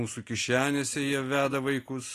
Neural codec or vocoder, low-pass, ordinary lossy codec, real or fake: none; 14.4 kHz; AAC, 48 kbps; real